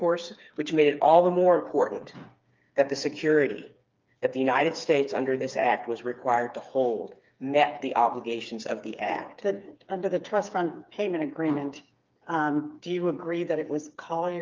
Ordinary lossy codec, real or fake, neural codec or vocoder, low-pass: Opus, 24 kbps; fake; codec, 16 kHz, 4 kbps, FreqCodec, smaller model; 7.2 kHz